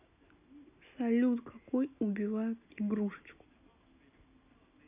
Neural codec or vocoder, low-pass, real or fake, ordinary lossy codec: none; 3.6 kHz; real; none